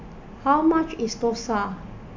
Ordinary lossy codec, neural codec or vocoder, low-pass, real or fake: AAC, 48 kbps; none; 7.2 kHz; real